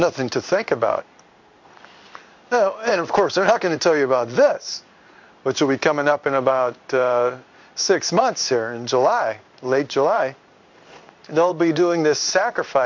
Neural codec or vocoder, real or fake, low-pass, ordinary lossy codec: codec, 16 kHz in and 24 kHz out, 1 kbps, XY-Tokenizer; fake; 7.2 kHz; MP3, 64 kbps